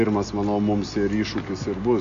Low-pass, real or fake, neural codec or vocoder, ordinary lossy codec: 7.2 kHz; real; none; AAC, 48 kbps